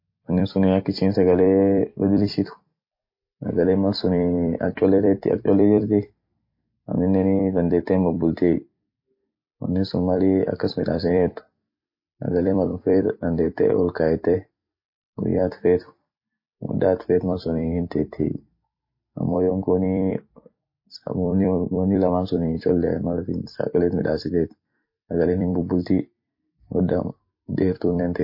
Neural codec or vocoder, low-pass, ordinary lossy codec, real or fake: vocoder, 44.1 kHz, 128 mel bands every 256 samples, BigVGAN v2; 5.4 kHz; MP3, 32 kbps; fake